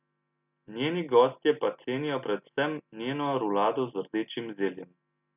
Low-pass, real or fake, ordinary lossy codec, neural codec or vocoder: 3.6 kHz; real; none; none